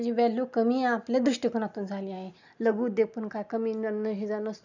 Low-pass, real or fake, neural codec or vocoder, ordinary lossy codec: 7.2 kHz; real; none; none